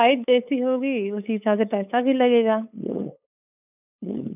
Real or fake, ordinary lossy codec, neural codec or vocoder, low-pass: fake; none; codec, 16 kHz, 8 kbps, FunCodec, trained on LibriTTS, 25 frames a second; 3.6 kHz